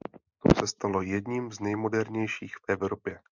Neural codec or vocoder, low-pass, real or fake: none; 7.2 kHz; real